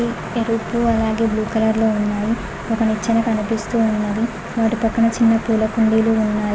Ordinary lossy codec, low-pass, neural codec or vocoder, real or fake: none; none; none; real